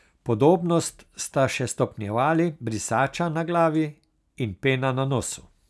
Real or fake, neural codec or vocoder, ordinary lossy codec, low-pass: real; none; none; none